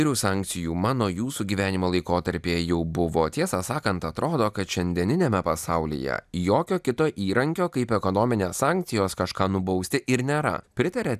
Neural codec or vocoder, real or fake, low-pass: none; real; 14.4 kHz